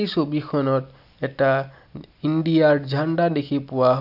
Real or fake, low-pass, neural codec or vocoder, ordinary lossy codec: real; 5.4 kHz; none; none